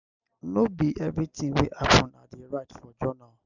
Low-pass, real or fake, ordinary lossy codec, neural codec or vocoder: 7.2 kHz; real; none; none